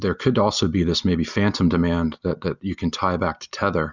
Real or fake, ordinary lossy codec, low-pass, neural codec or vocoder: real; Opus, 64 kbps; 7.2 kHz; none